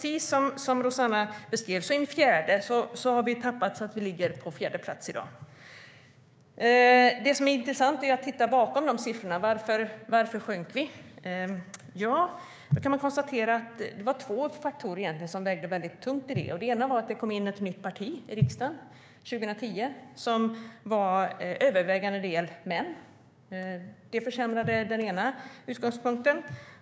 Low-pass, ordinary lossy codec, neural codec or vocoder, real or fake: none; none; codec, 16 kHz, 6 kbps, DAC; fake